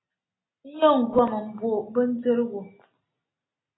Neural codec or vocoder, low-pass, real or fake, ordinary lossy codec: none; 7.2 kHz; real; AAC, 16 kbps